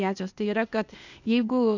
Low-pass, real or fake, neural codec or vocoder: 7.2 kHz; fake; codec, 16 kHz, 0.8 kbps, ZipCodec